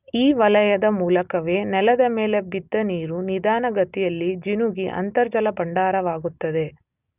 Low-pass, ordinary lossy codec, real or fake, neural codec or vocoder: 3.6 kHz; none; real; none